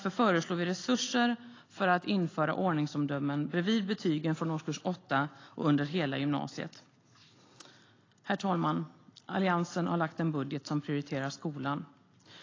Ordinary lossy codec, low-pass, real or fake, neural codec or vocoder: AAC, 32 kbps; 7.2 kHz; real; none